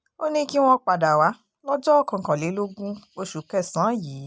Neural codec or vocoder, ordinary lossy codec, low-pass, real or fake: none; none; none; real